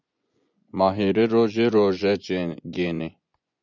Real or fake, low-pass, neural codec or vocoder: fake; 7.2 kHz; vocoder, 24 kHz, 100 mel bands, Vocos